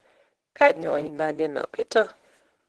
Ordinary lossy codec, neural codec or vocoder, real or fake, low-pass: Opus, 16 kbps; codec, 24 kHz, 0.9 kbps, WavTokenizer, medium speech release version 1; fake; 10.8 kHz